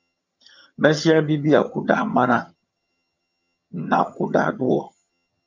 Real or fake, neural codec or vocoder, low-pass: fake; vocoder, 22.05 kHz, 80 mel bands, HiFi-GAN; 7.2 kHz